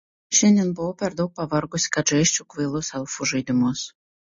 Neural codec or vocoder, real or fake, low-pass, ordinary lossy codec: none; real; 7.2 kHz; MP3, 32 kbps